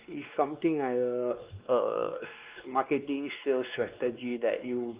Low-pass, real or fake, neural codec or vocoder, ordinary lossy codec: 3.6 kHz; fake; codec, 16 kHz, 2 kbps, X-Codec, WavLM features, trained on Multilingual LibriSpeech; Opus, 24 kbps